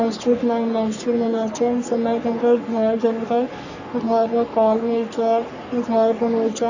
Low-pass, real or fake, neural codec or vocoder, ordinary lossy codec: 7.2 kHz; fake; codec, 44.1 kHz, 3.4 kbps, Pupu-Codec; none